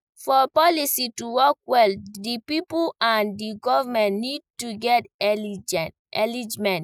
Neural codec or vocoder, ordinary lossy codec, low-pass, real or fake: none; none; 19.8 kHz; real